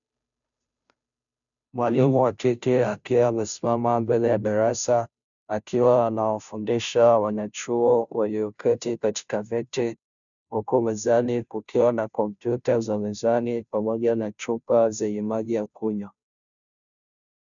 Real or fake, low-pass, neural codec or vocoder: fake; 7.2 kHz; codec, 16 kHz, 0.5 kbps, FunCodec, trained on Chinese and English, 25 frames a second